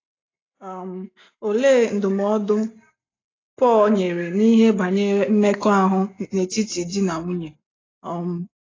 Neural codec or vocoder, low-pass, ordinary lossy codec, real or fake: none; 7.2 kHz; AAC, 32 kbps; real